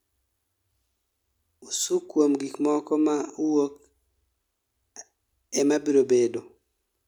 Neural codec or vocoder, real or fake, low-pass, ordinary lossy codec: none; real; 19.8 kHz; none